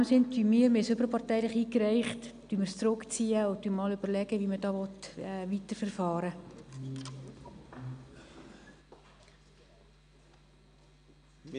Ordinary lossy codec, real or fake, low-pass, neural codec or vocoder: none; real; 9.9 kHz; none